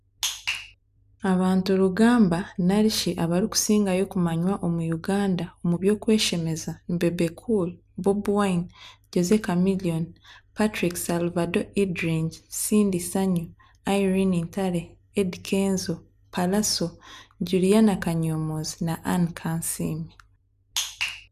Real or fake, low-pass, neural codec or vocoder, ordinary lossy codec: real; 14.4 kHz; none; none